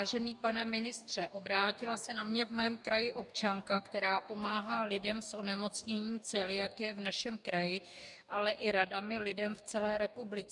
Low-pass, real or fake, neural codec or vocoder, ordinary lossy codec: 10.8 kHz; fake; codec, 44.1 kHz, 2.6 kbps, DAC; MP3, 96 kbps